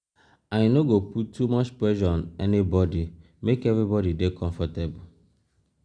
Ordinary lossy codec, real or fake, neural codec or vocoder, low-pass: none; real; none; 9.9 kHz